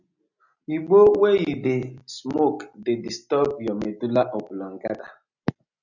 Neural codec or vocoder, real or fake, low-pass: none; real; 7.2 kHz